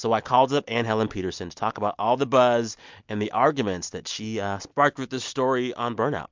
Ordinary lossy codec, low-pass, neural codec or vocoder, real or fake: MP3, 64 kbps; 7.2 kHz; none; real